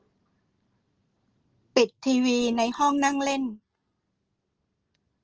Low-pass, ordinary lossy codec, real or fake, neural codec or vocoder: 7.2 kHz; Opus, 16 kbps; real; none